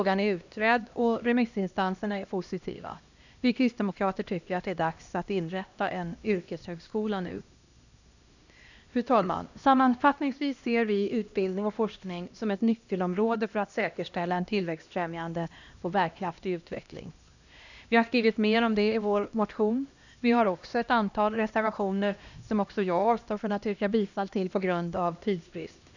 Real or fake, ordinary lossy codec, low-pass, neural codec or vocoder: fake; none; 7.2 kHz; codec, 16 kHz, 1 kbps, X-Codec, HuBERT features, trained on LibriSpeech